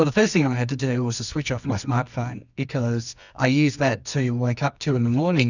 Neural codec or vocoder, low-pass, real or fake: codec, 24 kHz, 0.9 kbps, WavTokenizer, medium music audio release; 7.2 kHz; fake